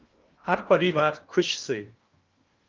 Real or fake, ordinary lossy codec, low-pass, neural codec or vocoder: fake; Opus, 32 kbps; 7.2 kHz; codec, 16 kHz in and 24 kHz out, 0.8 kbps, FocalCodec, streaming, 65536 codes